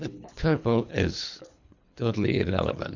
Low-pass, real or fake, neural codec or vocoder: 7.2 kHz; fake; codec, 24 kHz, 3 kbps, HILCodec